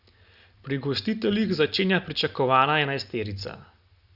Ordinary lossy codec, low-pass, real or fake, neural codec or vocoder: Opus, 64 kbps; 5.4 kHz; real; none